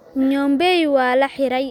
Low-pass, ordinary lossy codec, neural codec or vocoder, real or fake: 19.8 kHz; none; none; real